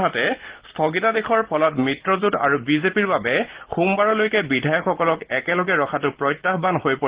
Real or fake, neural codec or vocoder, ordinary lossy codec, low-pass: real; none; Opus, 16 kbps; 3.6 kHz